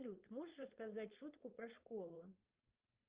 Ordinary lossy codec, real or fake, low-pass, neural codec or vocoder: Opus, 24 kbps; fake; 3.6 kHz; codec, 16 kHz, 4.8 kbps, FACodec